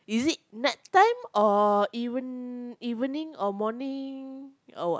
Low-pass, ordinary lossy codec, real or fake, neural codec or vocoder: none; none; real; none